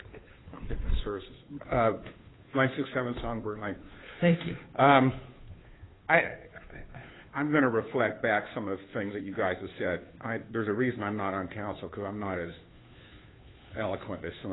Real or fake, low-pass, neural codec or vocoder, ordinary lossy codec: fake; 7.2 kHz; codec, 16 kHz, 2 kbps, FunCodec, trained on Chinese and English, 25 frames a second; AAC, 16 kbps